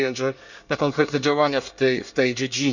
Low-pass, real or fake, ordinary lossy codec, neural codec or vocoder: 7.2 kHz; fake; none; codec, 24 kHz, 1 kbps, SNAC